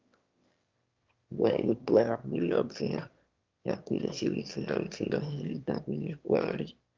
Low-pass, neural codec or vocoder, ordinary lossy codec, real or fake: 7.2 kHz; autoencoder, 22.05 kHz, a latent of 192 numbers a frame, VITS, trained on one speaker; Opus, 24 kbps; fake